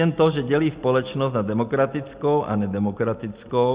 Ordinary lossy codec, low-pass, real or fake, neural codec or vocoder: Opus, 64 kbps; 3.6 kHz; real; none